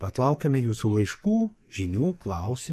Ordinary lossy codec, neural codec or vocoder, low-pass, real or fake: MP3, 64 kbps; codec, 44.1 kHz, 2.6 kbps, SNAC; 14.4 kHz; fake